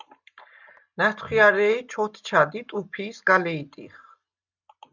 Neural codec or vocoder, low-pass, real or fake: none; 7.2 kHz; real